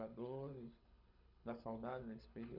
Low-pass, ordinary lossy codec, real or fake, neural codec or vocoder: 5.4 kHz; none; fake; codec, 24 kHz, 6 kbps, HILCodec